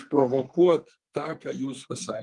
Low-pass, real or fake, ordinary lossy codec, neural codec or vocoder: 10.8 kHz; fake; Opus, 32 kbps; codec, 32 kHz, 1.9 kbps, SNAC